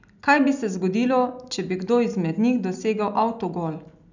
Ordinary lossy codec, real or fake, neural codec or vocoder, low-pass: none; real; none; 7.2 kHz